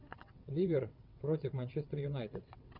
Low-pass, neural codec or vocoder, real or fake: 5.4 kHz; vocoder, 22.05 kHz, 80 mel bands, WaveNeXt; fake